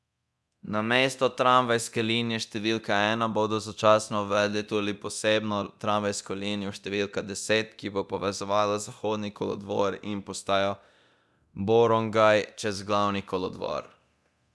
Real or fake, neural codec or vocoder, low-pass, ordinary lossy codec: fake; codec, 24 kHz, 0.9 kbps, DualCodec; none; none